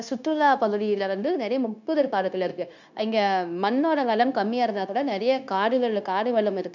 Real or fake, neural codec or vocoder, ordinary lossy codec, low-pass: fake; codec, 16 kHz, 0.9 kbps, LongCat-Audio-Codec; none; 7.2 kHz